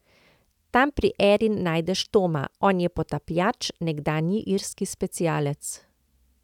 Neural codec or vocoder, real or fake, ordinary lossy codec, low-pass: none; real; none; 19.8 kHz